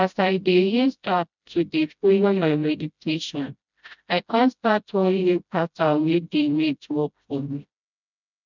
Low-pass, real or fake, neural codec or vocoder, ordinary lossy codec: 7.2 kHz; fake; codec, 16 kHz, 0.5 kbps, FreqCodec, smaller model; none